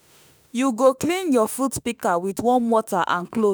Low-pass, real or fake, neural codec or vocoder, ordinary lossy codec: none; fake; autoencoder, 48 kHz, 32 numbers a frame, DAC-VAE, trained on Japanese speech; none